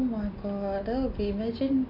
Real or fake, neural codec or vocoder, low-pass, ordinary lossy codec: real; none; 5.4 kHz; none